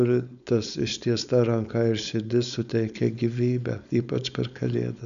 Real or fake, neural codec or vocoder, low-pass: fake; codec, 16 kHz, 4.8 kbps, FACodec; 7.2 kHz